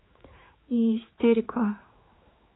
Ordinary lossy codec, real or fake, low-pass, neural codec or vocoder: AAC, 16 kbps; fake; 7.2 kHz; codec, 16 kHz, 4 kbps, X-Codec, HuBERT features, trained on general audio